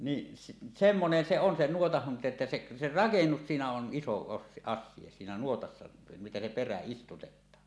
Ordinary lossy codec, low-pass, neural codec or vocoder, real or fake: none; none; none; real